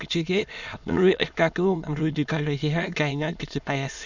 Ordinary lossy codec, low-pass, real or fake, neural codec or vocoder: none; 7.2 kHz; fake; autoencoder, 22.05 kHz, a latent of 192 numbers a frame, VITS, trained on many speakers